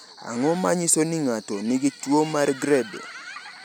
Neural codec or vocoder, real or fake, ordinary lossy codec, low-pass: none; real; none; none